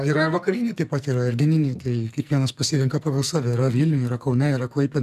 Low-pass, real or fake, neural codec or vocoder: 14.4 kHz; fake; codec, 44.1 kHz, 2.6 kbps, SNAC